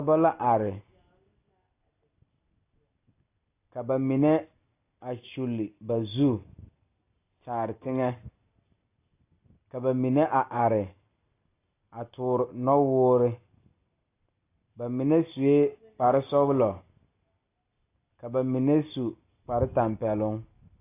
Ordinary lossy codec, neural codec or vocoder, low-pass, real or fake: AAC, 24 kbps; none; 3.6 kHz; real